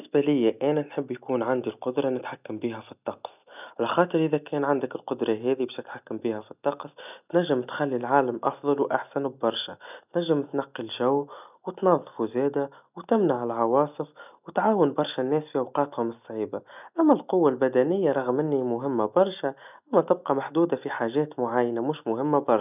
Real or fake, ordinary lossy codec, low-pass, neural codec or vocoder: real; none; 3.6 kHz; none